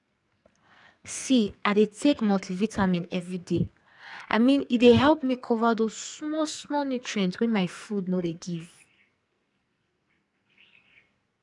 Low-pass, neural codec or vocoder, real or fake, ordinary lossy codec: 10.8 kHz; codec, 44.1 kHz, 2.6 kbps, SNAC; fake; none